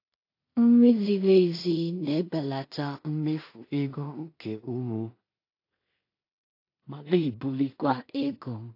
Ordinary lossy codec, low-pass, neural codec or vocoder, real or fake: AAC, 32 kbps; 5.4 kHz; codec, 16 kHz in and 24 kHz out, 0.4 kbps, LongCat-Audio-Codec, two codebook decoder; fake